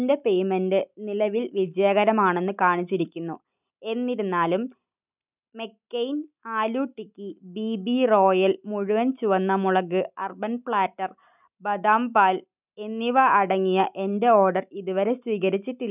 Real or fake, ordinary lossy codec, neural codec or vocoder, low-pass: real; none; none; 3.6 kHz